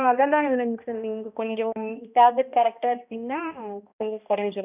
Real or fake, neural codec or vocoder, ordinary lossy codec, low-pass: fake; codec, 16 kHz, 1 kbps, X-Codec, HuBERT features, trained on balanced general audio; none; 3.6 kHz